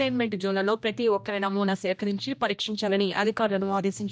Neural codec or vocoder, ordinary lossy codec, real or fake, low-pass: codec, 16 kHz, 1 kbps, X-Codec, HuBERT features, trained on general audio; none; fake; none